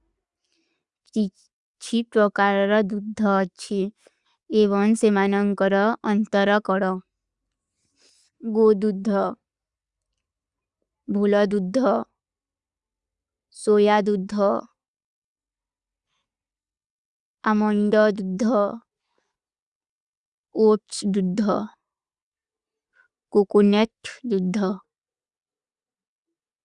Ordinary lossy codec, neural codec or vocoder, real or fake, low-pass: Opus, 64 kbps; none; real; 10.8 kHz